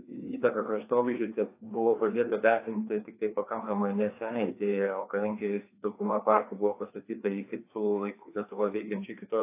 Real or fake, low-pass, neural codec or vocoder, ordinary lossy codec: fake; 3.6 kHz; codec, 16 kHz, 2 kbps, FreqCodec, larger model; AAC, 24 kbps